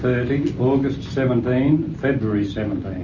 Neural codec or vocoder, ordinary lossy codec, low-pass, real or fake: none; MP3, 32 kbps; 7.2 kHz; real